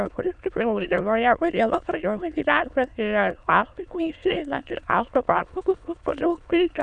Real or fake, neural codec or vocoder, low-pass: fake; autoencoder, 22.05 kHz, a latent of 192 numbers a frame, VITS, trained on many speakers; 9.9 kHz